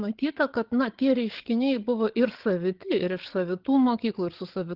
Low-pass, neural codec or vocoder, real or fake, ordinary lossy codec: 5.4 kHz; codec, 16 kHz, 8 kbps, FreqCodec, larger model; fake; Opus, 16 kbps